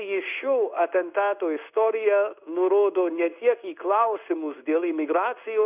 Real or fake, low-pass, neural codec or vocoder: fake; 3.6 kHz; codec, 16 kHz in and 24 kHz out, 1 kbps, XY-Tokenizer